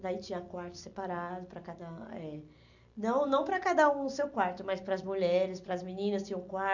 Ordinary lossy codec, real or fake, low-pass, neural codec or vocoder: none; real; 7.2 kHz; none